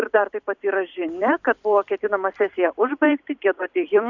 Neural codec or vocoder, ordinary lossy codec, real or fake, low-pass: vocoder, 44.1 kHz, 128 mel bands every 256 samples, BigVGAN v2; Opus, 64 kbps; fake; 7.2 kHz